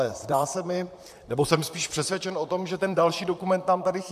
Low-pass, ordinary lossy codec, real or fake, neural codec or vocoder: 14.4 kHz; AAC, 96 kbps; fake; vocoder, 44.1 kHz, 128 mel bands, Pupu-Vocoder